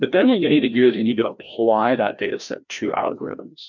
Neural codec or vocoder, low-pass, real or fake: codec, 16 kHz, 1 kbps, FreqCodec, larger model; 7.2 kHz; fake